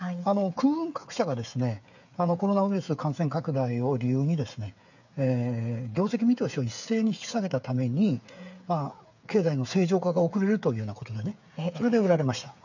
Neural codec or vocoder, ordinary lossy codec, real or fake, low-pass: codec, 16 kHz, 8 kbps, FreqCodec, smaller model; none; fake; 7.2 kHz